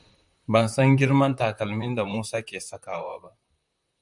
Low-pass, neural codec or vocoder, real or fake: 10.8 kHz; vocoder, 44.1 kHz, 128 mel bands, Pupu-Vocoder; fake